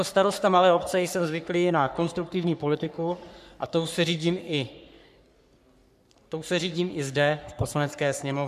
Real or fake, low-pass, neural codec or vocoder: fake; 14.4 kHz; codec, 44.1 kHz, 3.4 kbps, Pupu-Codec